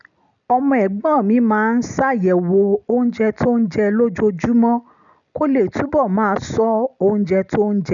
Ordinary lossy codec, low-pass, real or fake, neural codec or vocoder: none; 7.2 kHz; real; none